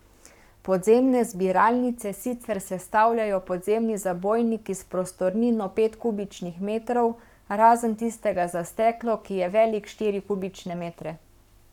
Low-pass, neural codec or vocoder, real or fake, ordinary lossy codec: 19.8 kHz; codec, 44.1 kHz, 7.8 kbps, Pupu-Codec; fake; none